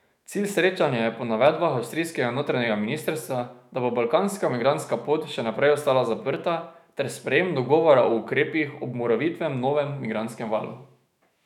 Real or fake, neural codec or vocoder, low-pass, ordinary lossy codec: fake; autoencoder, 48 kHz, 128 numbers a frame, DAC-VAE, trained on Japanese speech; 19.8 kHz; none